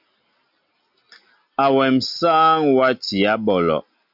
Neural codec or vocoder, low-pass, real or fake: none; 5.4 kHz; real